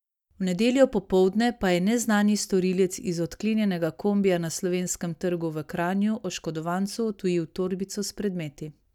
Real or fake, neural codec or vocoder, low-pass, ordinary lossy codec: real; none; 19.8 kHz; none